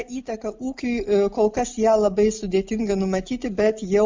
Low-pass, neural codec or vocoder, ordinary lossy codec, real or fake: 7.2 kHz; none; MP3, 64 kbps; real